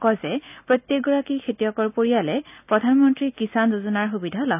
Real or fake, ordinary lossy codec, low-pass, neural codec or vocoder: real; none; 3.6 kHz; none